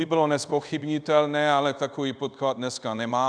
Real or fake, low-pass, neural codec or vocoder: fake; 9.9 kHz; codec, 24 kHz, 0.5 kbps, DualCodec